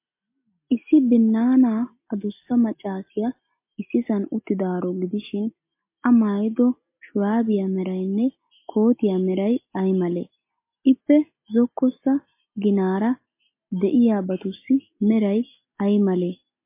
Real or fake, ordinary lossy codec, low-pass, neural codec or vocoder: real; MP3, 24 kbps; 3.6 kHz; none